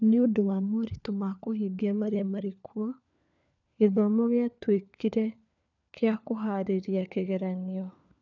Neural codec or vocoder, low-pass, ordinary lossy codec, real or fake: codec, 16 kHz, 4 kbps, FunCodec, trained on LibriTTS, 50 frames a second; 7.2 kHz; none; fake